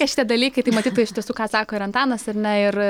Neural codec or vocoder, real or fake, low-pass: none; real; 19.8 kHz